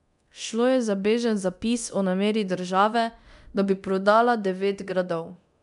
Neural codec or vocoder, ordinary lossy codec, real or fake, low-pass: codec, 24 kHz, 0.9 kbps, DualCodec; none; fake; 10.8 kHz